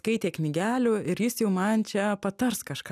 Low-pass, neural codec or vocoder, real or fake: 14.4 kHz; none; real